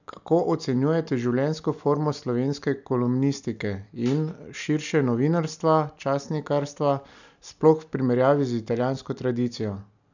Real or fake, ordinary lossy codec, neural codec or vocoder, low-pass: real; none; none; 7.2 kHz